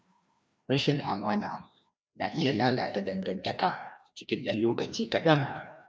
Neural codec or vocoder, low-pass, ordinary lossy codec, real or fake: codec, 16 kHz, 1 kbps, FreqCodec, larger model; none; none; fake